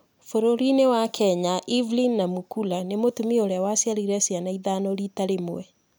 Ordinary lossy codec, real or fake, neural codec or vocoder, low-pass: none; real; none; none